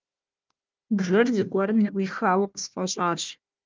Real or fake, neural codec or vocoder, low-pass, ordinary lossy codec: fake; codec, 16 kHz, 1 kbps, FunCodec, trained on Chinese and English, 50 frames a second; 7.2 kHz; Opus, 32 kbps